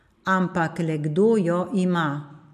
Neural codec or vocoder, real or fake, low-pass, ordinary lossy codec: none; real; 14.4 kHz; MP3, 64 kbps